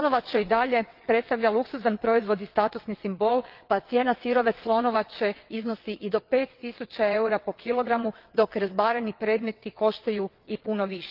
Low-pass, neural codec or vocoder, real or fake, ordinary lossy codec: 5.4 kHz; vocoder, 22.05 kHz, 80 mel bands, WaveNeXt; fake; Opus, 32 kbps